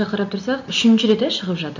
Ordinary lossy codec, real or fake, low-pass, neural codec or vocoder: none; real; 7.2 kHz; none